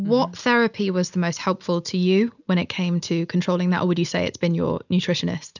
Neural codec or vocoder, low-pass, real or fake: none; 7.2 kHz; real